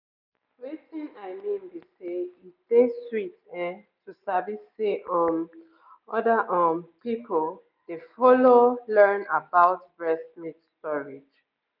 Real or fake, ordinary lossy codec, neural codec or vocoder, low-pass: real; none; none; 5.4 kHz